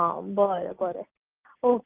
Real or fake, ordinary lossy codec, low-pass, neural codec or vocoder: real; Opus, 24 kbps; 3.6 kHz; none